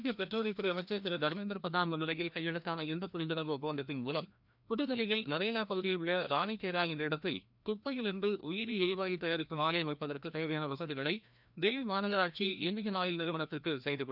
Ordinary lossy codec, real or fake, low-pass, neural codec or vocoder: none; fake; 5.4 kHz; codec, 16 kHz, 1 kbps, FreqCodec, larger model